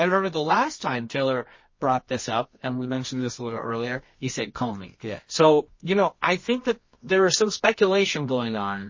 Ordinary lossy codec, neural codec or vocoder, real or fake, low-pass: MP3, 32 kbps; codec, 24 kHz, 0.9 kbps, WavTokenizer, medium music audio release; fake; 7.2 kHz